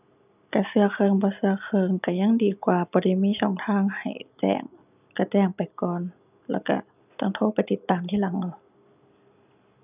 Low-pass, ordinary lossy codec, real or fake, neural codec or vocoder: 3.6 kHz; none; real; none